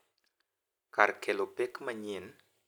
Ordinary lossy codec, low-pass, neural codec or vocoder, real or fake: none; none; none; real